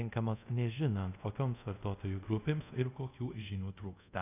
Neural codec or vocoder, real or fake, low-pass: codec, 24 kHz, 0.5 kbps, DualCodec; fake; 3.6 kHz